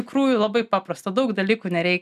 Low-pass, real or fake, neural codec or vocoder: 14.4 kHz; real; none